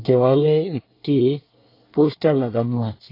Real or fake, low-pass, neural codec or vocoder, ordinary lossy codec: fake; 5.4 kHz; codec, 24 kHz, 1 kbps, SNAC; AAC, 32 kbps